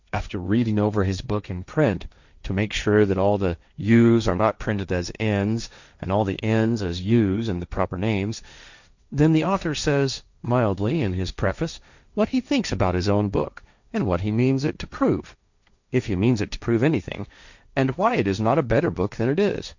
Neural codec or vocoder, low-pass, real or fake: codec, 16 kHz, 1.1 kbps, Voila-Tokenizer; 7.2 kHz; fake